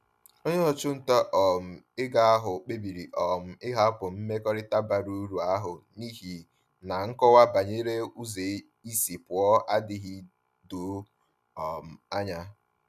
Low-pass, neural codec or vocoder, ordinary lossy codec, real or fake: 14.4 kHz; none; none; real